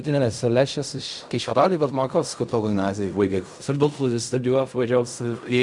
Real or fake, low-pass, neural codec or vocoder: fake; 10.8 kHz; codec, 16 kHz in and 24 kHz out, 0.4 kbps, LongCat-Audio-Codec, fine tuned four codebook decoder